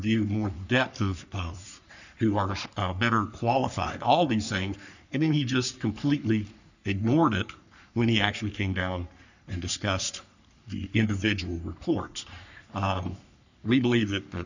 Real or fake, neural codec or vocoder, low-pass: fake; codec, 44.1 kHz, 3.4 kbps, Pupu-Codec; 7.2 kHz